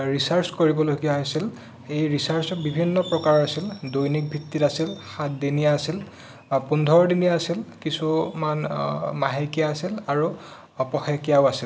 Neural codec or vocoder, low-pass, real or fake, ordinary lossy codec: none; none; real; none